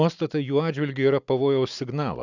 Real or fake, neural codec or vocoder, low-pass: real; none; 7.2 kHz